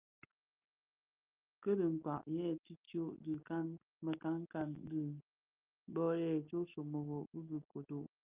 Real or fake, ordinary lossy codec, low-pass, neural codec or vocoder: real; Opus, 24 kbps; 3.6 kHz; none